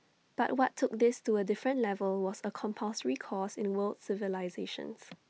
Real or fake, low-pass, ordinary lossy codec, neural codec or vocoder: real; none; none; none